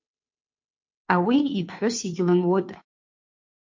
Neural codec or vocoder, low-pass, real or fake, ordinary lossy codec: codec, 16 kHz, 2 kbps, FunCodec, trained on Chinese and English, 25 frames a second; 7.2 kHz; fake; MP3, 48 kbps